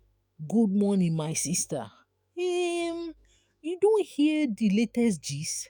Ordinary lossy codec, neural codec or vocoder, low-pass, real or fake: none; autoencoder, 48 kHz, 128 numbers a frame, DAC-VAE, trained on Japanese speech; none; fake